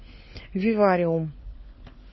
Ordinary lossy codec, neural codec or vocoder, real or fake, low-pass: MP3, 24 kbps; codec, 16 kHz, 4 kbps, FunCodec, trained on LibriTTS, 50 frames a second; fake; 7.2 kHz